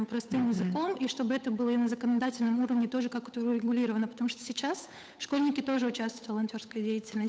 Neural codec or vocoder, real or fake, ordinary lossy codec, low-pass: codec, 16 kHz, 8 kbps, FunCodec, trained on Chinese and English, 25 frames a second; fake; none; none